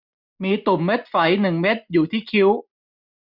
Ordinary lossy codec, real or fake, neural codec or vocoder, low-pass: none; real; none; 5.4 kHz